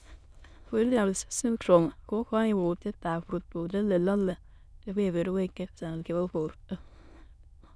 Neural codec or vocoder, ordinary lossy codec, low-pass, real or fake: autoencoder, 22.05 kHz, a latent of 192 numbers a frame, VITS, trained on many speakers; none; none; fake